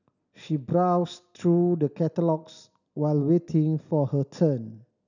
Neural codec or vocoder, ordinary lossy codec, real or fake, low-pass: none; none; real; 7.2 kHz